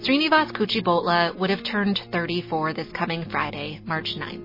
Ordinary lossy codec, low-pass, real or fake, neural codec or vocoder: MP3, 24 kbps; 5.4 kHz; real; none